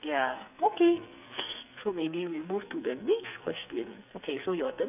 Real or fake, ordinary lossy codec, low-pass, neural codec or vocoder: fake; none; 3.6 kHz; codec, 44.1 kHz, 2.6 kbps, SNAC